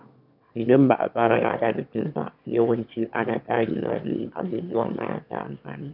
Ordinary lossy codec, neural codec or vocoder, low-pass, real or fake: none; autoencoder, 22.05 kHz, a latent of 192 numbers a frame, VITS, trained on one speaker; 5.4 kHz; fake